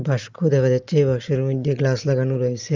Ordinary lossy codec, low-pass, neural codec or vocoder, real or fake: Opus, 32 kbps; 7.2 kHz; none; real